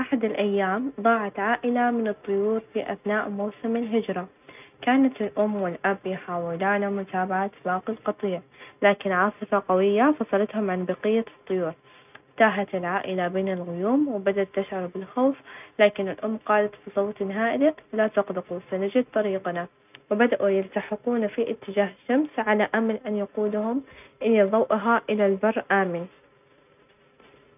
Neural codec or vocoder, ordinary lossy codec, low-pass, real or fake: none; none; 3.6 kHz; real